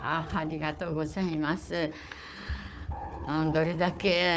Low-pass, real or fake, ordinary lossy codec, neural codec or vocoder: none; fake; none; codec, 16 kHz, 4 kbps, FunCodec, trained on Chinese and English, 50 frames a second